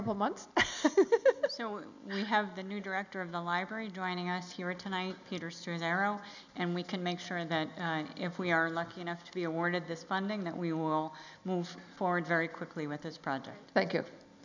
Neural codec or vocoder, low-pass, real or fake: none; 7.2 kHz; real